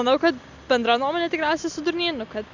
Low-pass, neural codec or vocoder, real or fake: 7.2 kHz; none; real